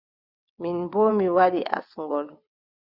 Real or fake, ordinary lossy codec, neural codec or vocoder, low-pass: fake; Opus, 64 kbps; vocoder, 22.05 kHz, 80 mel bands, WaveNeXt; 5.4 kHz